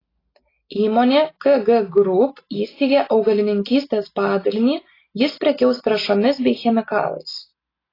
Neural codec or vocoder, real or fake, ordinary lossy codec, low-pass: none; real; AAC, 24 kbps; 5.4 kHz